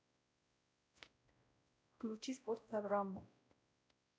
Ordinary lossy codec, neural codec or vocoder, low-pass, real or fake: none; codec, 16 kHz, 0.5 kbps, X-Codec, WavLM features, trained on Multilingual LibriSpeech; none; fake